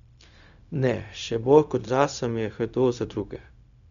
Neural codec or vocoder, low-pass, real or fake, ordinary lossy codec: codec, 16 kHz, 0.4 kbps, LongCat-Audio-Codec; 7.2 kHz; fake; none